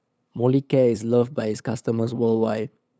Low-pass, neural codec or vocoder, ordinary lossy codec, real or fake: none; codec, 16 kHz, 8 kbps, FunCodec, trained on LibriTTS, 25 frames a second; none; fake